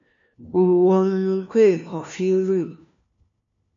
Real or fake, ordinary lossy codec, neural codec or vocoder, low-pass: fake; MP3, 64 kbps; codec, 16 kHz, 1 kbps, FunCodec, trained on LibriTTS, 50 frames a second; 7.2 kHz